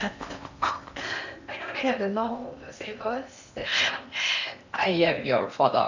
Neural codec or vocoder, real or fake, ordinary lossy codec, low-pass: codec, 16 kHz in and 24 kHz out, 0.8 kbps, FocalCodec, streaming, 65536 codes; fake; none; 7.2 kHz